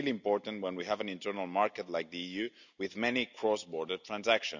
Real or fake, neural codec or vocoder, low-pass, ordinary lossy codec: real; none; 7.2 kHz; none